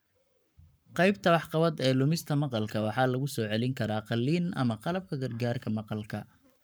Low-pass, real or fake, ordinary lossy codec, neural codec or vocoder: none; fake; none; codec, 44.1 kHz, 7.8 kbps, Pupu-Codec